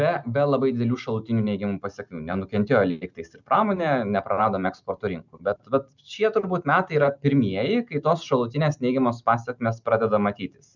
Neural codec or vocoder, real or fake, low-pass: none; real; 7.2 kHz